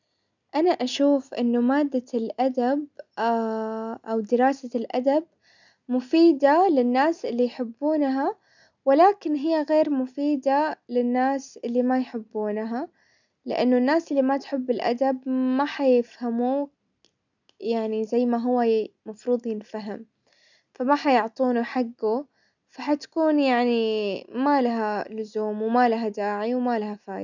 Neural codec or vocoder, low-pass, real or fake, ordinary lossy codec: none; 7.2 kHz; real; none